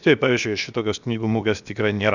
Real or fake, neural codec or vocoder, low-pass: fake; codec, 16 kHz, 0.7 kbps, FocalCodec; 7.2 kHz